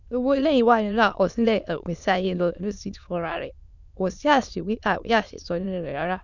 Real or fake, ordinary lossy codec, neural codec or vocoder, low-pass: fake; none; autoencoder, 22.05 kHz, a latent of 192 numbers a frame, VITS, trained on many speakers; 7.2 kHz